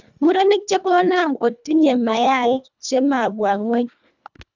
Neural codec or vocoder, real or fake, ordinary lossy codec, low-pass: codec, 24 kHz, 1.5 kbps, HILCodec; fake; none; 7.2 kHz